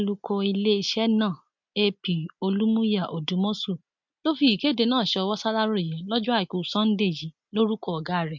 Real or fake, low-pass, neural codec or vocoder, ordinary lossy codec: real; 7.2 kHz; none; MP3, 64 kbps